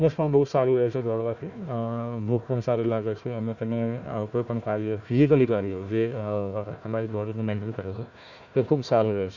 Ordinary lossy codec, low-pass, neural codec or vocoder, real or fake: none; 7.2 kHz; codec, 16 kHz, 1 kbps, FunCodec, trained on Chinese and English, 50 frames a second; fake